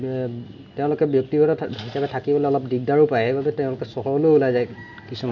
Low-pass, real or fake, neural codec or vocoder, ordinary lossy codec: 7.2 kHz; real; none; none